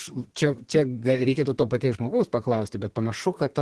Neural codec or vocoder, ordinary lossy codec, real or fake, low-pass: codec, 32 kHz, 1.9 kbps, SNAC; Opus, 16 kbps; fake; 10.8 kHz